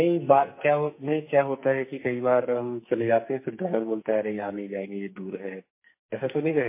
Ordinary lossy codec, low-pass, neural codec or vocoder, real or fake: MP3, 24 kbps; 3.6 kHz; codec, 44.1 kHz, 2.6 kbps, SNAC; fake